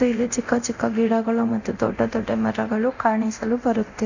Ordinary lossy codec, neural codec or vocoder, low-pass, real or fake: none; codec, 24 kHz, 0.9 kbps, DualCodec; 7.2 kHz; fake